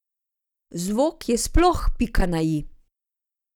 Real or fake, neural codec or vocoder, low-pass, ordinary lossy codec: real; none; 19.8 kHz; none